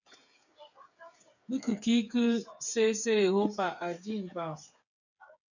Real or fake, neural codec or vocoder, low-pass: fake; codec, 16 kHz, 8 kbps, FreqCodec, smaller model; 7.2 kHz